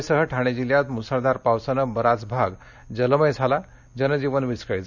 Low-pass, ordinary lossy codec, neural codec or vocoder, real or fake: 7.2 kHz; none; none; real